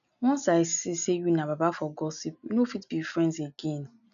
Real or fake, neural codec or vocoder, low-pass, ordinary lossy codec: real; none; 7.2 kHz; none